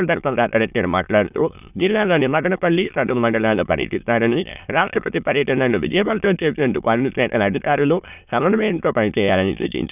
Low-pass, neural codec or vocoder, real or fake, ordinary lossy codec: 3.6 kHz; autoencoder, 22.05 kHz, a latent of 192 numbers a frame, VITS, trained on many speakers; fake; none